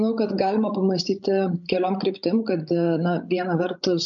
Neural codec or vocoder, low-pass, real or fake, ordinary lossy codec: codec, 16 kHz, 16 kbps, FreqCodec, larger model; 7.2 kHz; fake; MP3, 64 kbps